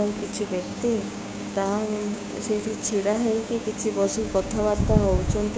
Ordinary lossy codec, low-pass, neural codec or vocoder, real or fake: none; none; codec, 16 kHz, 6 kbps, DAC; fake